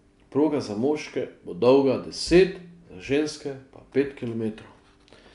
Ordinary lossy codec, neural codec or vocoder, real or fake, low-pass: none; none; real; 10.8 kHz